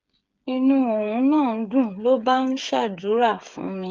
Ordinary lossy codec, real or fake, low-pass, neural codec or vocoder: Opus, 32 kbps; fake; 7.2 kHz; codec, 16 kHz, 8 kbps, FreqCodec, smaller model